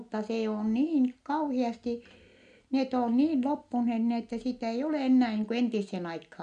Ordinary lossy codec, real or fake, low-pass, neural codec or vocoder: MP3, 64 kbps; real; 9.9 kHz; none